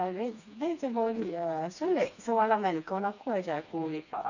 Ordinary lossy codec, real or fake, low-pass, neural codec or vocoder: none; fake; 7.2 kHz; codec, 16 kHz, 2 kbps, FreqCodec, smaller model